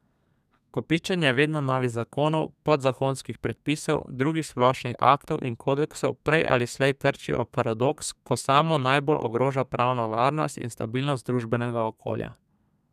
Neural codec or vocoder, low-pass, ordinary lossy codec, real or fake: codec, 32 kHz, 1.9 kbps, SNAC; 14.4 kHz; none; fake